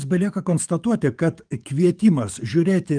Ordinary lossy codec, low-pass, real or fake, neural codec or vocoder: Opus, 32 kbps; 9.9 kHz; fake; codec, 44.1 kHz, 7.8 kbps, DAC